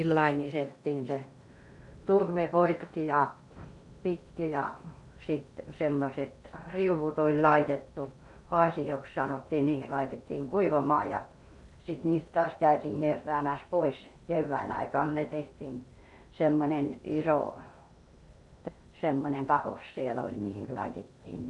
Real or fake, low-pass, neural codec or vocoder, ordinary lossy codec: fake; 10.8 kHz; codec, 16 kHz in and 24 kHz out, 0.8 kbps, FocalCodec, streaming, 65536 codes; none